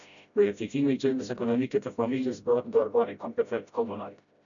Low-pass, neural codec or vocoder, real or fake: 7.2 kHz; codec, 16 kHz, 0.5 kbps, FreqCodec, smaller model; fake